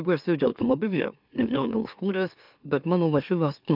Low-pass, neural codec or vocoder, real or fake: 5.4 kHz; autoencoder, 44.1 kHz, a latent of 192 numbers a frame, MeloTTS; fake